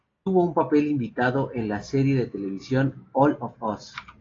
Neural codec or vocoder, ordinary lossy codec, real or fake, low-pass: none; AAC, 32 kbps; real; 7.2 kHz